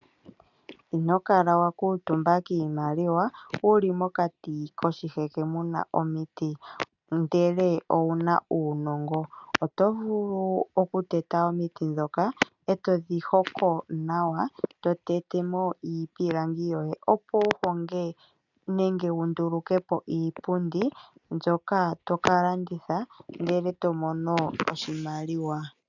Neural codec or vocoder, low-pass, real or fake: none; 7.2 kHz; real